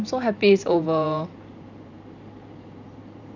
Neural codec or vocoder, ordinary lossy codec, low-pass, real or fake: vocoder, 44.1 kHz, 128 mel bands every 512 samples, BigVGAN v2; none; 7.2 kHz; fake